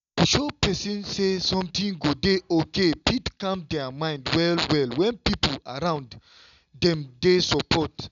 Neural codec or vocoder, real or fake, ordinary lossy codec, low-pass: none; real; none; 7.2 kHz